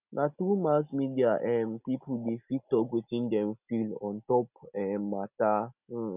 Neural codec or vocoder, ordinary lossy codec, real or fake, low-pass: none; none; real; 3.6 kHz